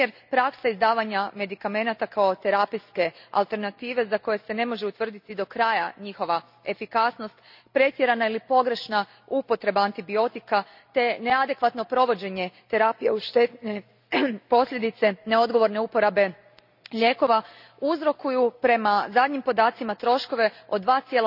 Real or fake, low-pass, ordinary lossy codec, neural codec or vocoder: real; 5.4 kHz; none; none